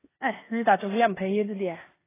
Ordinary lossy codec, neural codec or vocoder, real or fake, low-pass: AAC, 16 kbps; codec, 16 kHz in and 24 kHz out, 0.9 kbps, LongCat-Audio-Codec, four codebook decoder; fake; 3.6 kHz